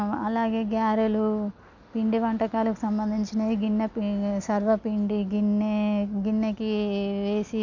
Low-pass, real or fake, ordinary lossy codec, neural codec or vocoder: 7.2 kHz; real; none; none